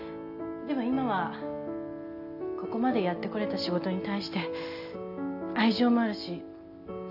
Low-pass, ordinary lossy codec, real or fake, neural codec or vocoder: 5.4 kHz; AAC, 48 kbps; real; none